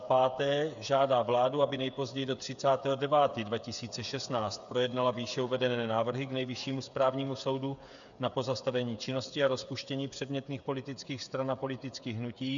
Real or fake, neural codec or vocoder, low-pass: fake; codec, 16 kHz, 8 kbps, FreqCodec, smaller model; 7.2 kHz